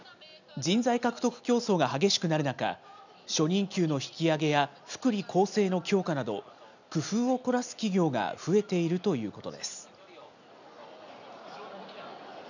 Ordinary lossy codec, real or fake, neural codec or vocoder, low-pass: none; real; none; 7.2 kHz